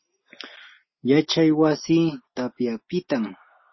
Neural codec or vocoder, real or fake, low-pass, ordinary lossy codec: none; real; 7.2 kHz; MP3, 24 kbps